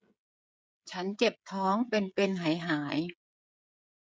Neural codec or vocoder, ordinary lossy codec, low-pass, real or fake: codec, 16 kHz, 16 kbps, FreqCodec, smaller model; none; none; fake